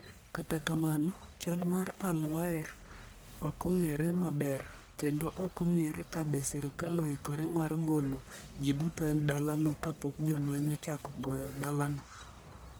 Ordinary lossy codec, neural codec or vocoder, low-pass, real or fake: none; codec, 44.1 kHz, 1.7 kbps, Pupu-Codec; none; fake